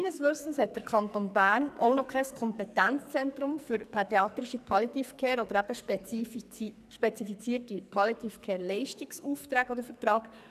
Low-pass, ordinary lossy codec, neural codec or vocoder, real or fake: 14.4 kHz; none; codec, 44.1 kHz, 2.6 kbps, SNAC; fake